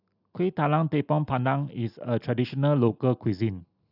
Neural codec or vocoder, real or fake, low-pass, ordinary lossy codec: none; real; 5.4 kHz; AAC, 48 kbps